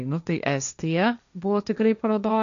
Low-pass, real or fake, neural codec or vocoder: 7.2 kHz; fake; codec, 16 kHz, 1.1 kbps, Voila-Tokenizer